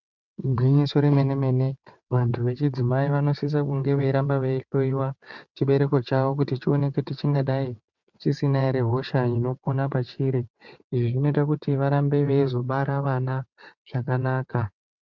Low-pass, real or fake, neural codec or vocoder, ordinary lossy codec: 7.2 kHz; fake; vocoder, 22.05 kHz, 80 mel bands, WaveNeXt; MP3, 64 kbps